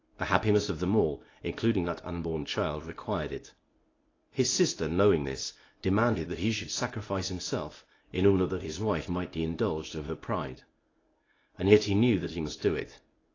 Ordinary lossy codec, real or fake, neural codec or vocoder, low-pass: AAC, 32 kbps; fake; codec, 24 kHz, 0.9 kbps, WavTokenizer, medium speech release version 1; 7.2 kHz